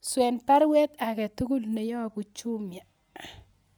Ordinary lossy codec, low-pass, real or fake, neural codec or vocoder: none; none; real; none